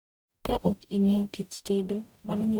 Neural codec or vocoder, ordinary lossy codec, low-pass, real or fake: codec, 44.1 kHz, 0.9 kbps, DAC; none; none; fake